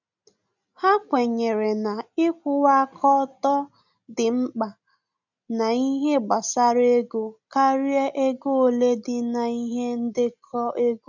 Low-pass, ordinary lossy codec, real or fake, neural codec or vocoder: 7.2 kHz; none; real; none